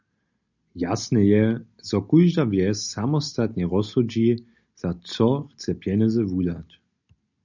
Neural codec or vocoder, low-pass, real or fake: none; 7.2 kHz; real